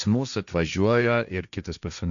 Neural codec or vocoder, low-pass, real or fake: codec, 16 kHz, 1.1 kbps, Voila-Tokenizer; 7.2 kHz; fake